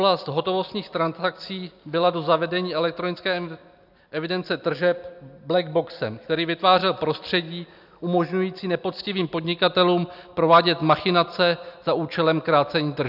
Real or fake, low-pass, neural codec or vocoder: real; 5.4 kHz; none